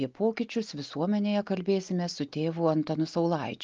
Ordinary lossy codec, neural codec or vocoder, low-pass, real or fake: Opus, 32 kbps; none; 7.2 kHz; real